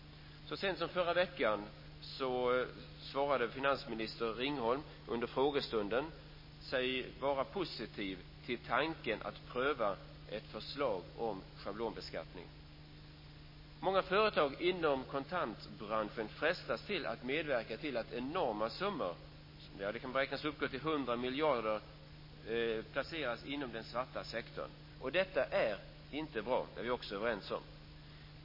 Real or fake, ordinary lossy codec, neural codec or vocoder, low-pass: real; MP3, 24 kbps; none; 5.4 kHz